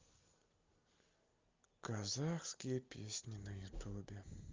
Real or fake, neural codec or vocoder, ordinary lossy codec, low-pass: real; none; Opus, 24 kbps; 7.2 kHz